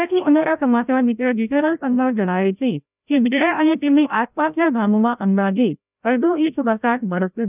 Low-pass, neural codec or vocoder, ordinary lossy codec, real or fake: 3.6 kHz; codec, 16 kHz, 0.5 kbps, FreqCodec, larger model; none; fake